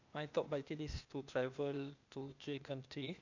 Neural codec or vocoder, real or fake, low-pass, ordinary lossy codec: codec, 16 kHz, 0.8 kbps, ZipCodec; fake; 7.2 kHz; none